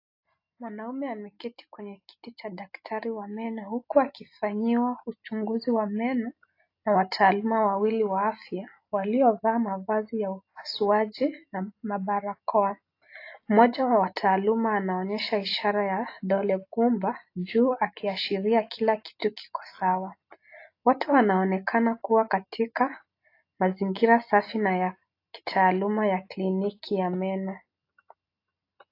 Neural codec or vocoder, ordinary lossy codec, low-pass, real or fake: none; AAC, 32 kbps; 5.4 kHz; real